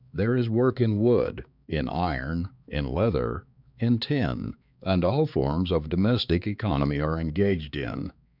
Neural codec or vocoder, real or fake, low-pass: codec, 16 kHz, 4 kbps, X-Codec, HuBERT features, trained on balanced general audio; fake; 5.4 kHz